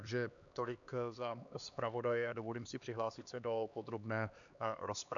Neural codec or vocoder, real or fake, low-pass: codec, 16 kHz, 2 kbps, X-Codec, HuBERT features, trained on LibriSpeech; fake; 7.2 kHz